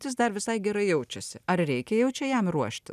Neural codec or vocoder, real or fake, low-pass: none; real; 14.4 kHz